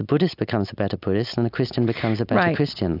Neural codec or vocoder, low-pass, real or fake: none; 5.4 kHz; real